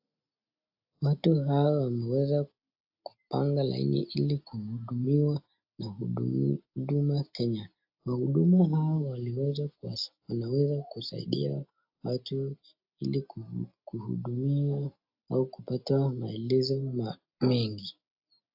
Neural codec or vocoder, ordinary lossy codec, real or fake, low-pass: none; AAC, 48 kbps; real; 5.4 kHz